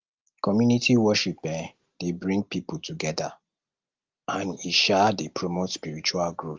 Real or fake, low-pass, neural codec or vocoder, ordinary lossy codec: real; 7.2 kHz; none; Opus, 24 kbps